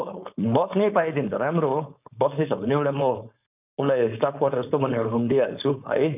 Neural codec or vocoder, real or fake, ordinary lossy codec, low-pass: codec, 16 kHz, 4.8 kbps, FACodec; fake; none; 3.6 kHz